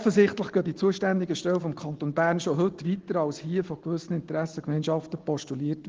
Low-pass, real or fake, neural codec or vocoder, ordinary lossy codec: 7.2 kHz; real; none; Opus, 16 kbps